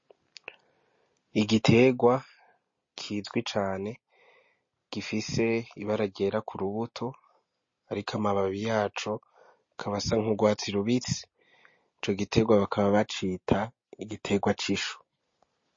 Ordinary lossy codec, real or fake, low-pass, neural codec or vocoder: MP3, 32 kbps; real; 7.2 kHz; none